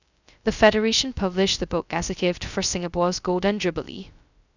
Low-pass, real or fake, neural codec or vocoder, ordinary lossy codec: 7.2 kHz; fake; codec, 16 kHz, 0.2 kbps, FocalCodec; none